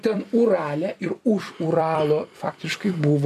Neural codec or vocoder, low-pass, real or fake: vocoder, 44.1 kHz, 128 mel bands every 256 samples, BigVGAN v2; 14.4 kHz; fake